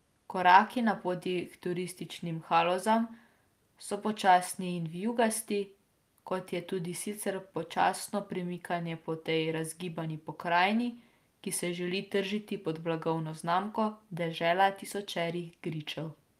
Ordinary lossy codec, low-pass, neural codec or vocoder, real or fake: Opus, 32 kbps; 14.4 kHz; none; real